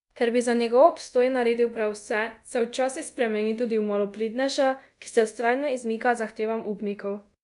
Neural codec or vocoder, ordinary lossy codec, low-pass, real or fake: codec, 24 kHz, 0.5 kbps, DualCodec; none; 10.8 kHz; fake